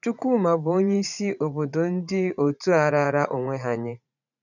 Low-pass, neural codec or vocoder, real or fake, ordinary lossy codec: 7.2 kHz; vocoder, 44.1 kHz, 80 mel bands, Vocos; fake; none